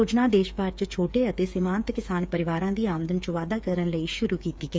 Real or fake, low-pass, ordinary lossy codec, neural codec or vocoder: fake; none; none; codec, 16 kHz, 8 kbps, FreqCodec, smaller model